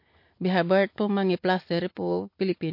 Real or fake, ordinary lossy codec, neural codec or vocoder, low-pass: real; MP3, 32 kbps; none; 5.4 kHz